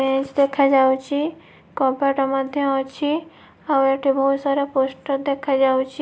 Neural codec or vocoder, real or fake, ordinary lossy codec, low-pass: none; real; none; none